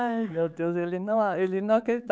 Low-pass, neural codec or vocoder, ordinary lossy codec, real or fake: none; codec, 16 kHz, 4 kbps, X-Codec, HuBERT features, trained on LibriSpeech; none; fake